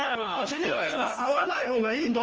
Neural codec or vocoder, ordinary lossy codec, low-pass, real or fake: codec, 16 kHz, 1 kbps, FunCodec, trained on LibriTTS, 50 frames a second; Opus, 24 kbps; 7.2 kHz; fake